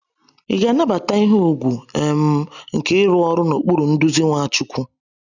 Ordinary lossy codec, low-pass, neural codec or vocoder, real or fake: none; 7.2 kHz; none; real